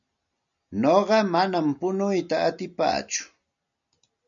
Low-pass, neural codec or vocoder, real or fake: 7.2 kHz; none; real